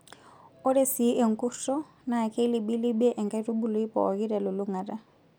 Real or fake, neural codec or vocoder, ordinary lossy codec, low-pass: real; none; none; none